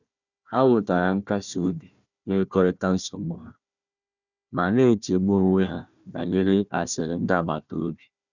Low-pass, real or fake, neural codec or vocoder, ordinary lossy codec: 7.2 kHz; fake; codec, 16 kHz, 1 kbps, FunCodec, trained on Chinese and English, 50 frames a second; none